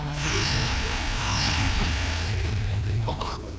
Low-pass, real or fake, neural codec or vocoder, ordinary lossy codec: none; fake; codec, 16 kHz, 1 kbps, FreqCodec, larger model; none